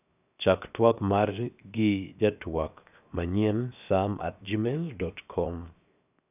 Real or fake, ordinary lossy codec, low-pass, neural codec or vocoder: fake; none; 3.6 kHz; codec, 16 kHz, 0.7 kbps, FocalCodec